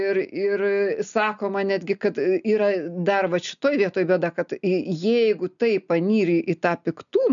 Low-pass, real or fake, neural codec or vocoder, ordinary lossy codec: 7.2 kHz; real; none; MP3, 96 kbps